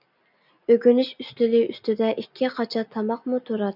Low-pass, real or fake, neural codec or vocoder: 5.4 kHz; real; none